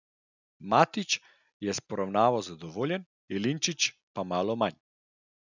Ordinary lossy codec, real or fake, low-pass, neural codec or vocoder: none; real; 7.2 kHz; none